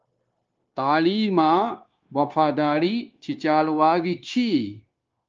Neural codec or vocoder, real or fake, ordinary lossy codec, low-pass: codec, 16 kHz, 0.9 kbps, LongCat-Audio-Codec; fake; Opus, 24 kbps; 7.2 kHz